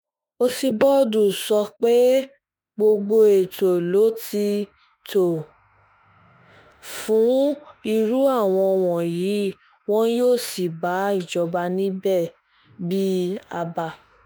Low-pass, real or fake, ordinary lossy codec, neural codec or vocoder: none; fake; none; autoencoder, 48 kHz, 32 numbers a frame, DAC-VAE, trained on Japanese speech